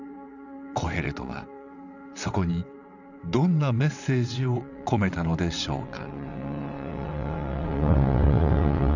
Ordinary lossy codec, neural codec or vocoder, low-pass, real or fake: none; vocoder, 22.05 kHz, 80 mel bands, WaveNeXt; 7.2 kHz; fake